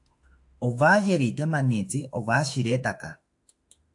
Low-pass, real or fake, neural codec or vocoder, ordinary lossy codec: 10.8 kHz; fake; autoencoder, 48 kHz, 32 numbers a frame, DAC-VAE, trained on Japanese speech; AAC, 64 kbps